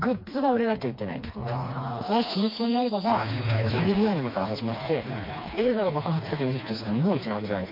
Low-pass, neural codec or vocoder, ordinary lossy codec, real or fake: 5.4 kHz; codec, 16 kHz, 2 kbps, FreqCodec, smaller model; MP3, 32 kbps; fake